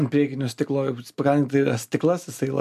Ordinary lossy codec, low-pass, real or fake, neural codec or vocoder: MP3, 96 kbps; 14.4 kHz; real; none